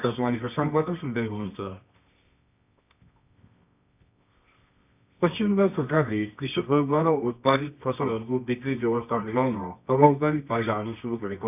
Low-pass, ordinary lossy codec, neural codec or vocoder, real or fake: 3.6 kHz; none; codec, 24 kHz, 0.9 kbps, WavTokenizer, medium music audio release; fake